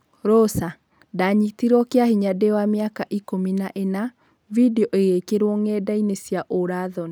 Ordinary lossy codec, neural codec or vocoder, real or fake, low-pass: none; none; real; none